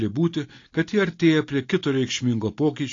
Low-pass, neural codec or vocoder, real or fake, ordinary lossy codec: 7.2 kHz; none; real; AAC, 32 kbps